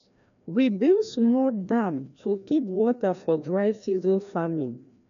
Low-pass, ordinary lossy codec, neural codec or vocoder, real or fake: 7.2 kHz; none; codec, 16 kHz, 1 kbps, FreqCodec, larger model; fake